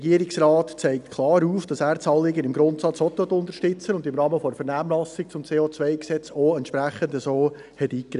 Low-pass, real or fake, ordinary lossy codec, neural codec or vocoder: 10.8 kHz; real; none; none